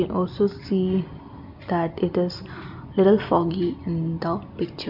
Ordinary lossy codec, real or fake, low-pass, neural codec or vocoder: none; real; 5.4 kHz; none